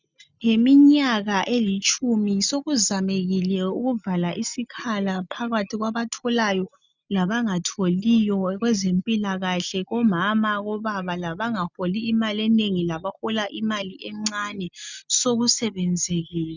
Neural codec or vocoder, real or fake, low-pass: none; real; 7.2 kHz